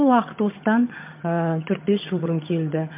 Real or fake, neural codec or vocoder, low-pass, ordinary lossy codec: fake; vocoder, 22.05 kHz, 80 mel bands, HiFi-GAN; 3.6 kHz; MP3, 32 kbps